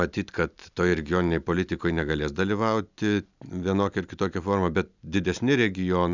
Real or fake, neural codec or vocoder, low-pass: real; none; 7.2 kHz